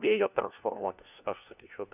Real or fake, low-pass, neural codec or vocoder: fake; 3.6 kHz; codec, 16 kHz, 1 kbps, FunCodec, trained on LibriTTS, 50 frames a second